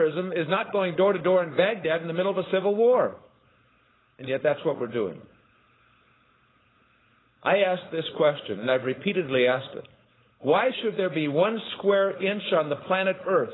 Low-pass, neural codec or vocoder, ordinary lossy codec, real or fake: 7.2 kHz; codec, 16 kHz, 4.8 kbps, FACodec; AAC, 16 kbps; fake